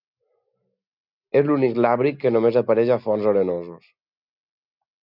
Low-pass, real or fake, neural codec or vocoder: 5.4 kHz; real; none